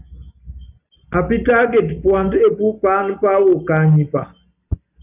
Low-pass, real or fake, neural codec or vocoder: 3.6 kHz; real; none